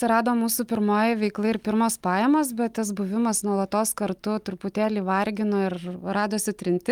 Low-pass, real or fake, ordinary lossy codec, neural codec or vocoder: 19.8 kHz; real; Opus, 32 kbps; none